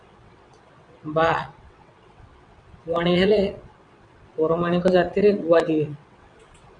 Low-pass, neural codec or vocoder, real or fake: 9.9 kHz; vocoder, 22.05 kHz, 80 mel bands, WaveNeXt; fake